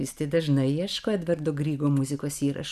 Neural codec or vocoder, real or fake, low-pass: none; real; 14.4 kHz